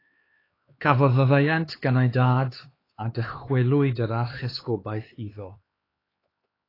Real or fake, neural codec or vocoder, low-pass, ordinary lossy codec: fake; codec, 16 kHz, 4 kbps, X-Codec, HuBERT features, trained on LibriSpeech; 5.4 kHz; AAC, 24 kbps